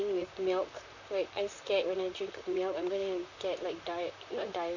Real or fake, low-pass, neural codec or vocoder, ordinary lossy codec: fake; 7.2 kHz; vocoder, 44.1 kHz, 128 mel bands, Pupu-Vocoder; none